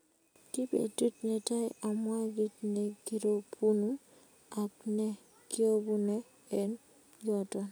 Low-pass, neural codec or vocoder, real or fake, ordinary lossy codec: none; none; real; none